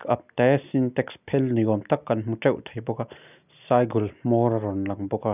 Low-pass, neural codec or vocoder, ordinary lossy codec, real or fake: 3.6 kHz; none; none; real